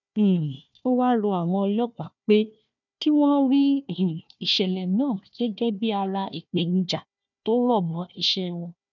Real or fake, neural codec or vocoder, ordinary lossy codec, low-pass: fake; codec, 16 kHz, 1 kbps, FunCodec, trained on Chinese and English, 50 frames a second; none; 7.2 kHz